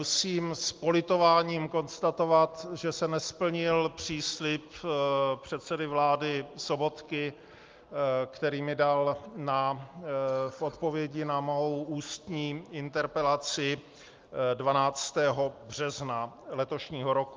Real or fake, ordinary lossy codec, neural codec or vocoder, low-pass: real; Opus, 24 kbps; none; 7.2 kHz